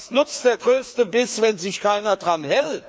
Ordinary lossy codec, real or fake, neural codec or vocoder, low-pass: none; fake; codec, 16 kHz, 2 kbps, FreqCodec, larger model; none